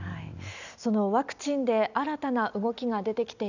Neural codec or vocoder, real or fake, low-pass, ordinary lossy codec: none; real; 7.2 kHz; none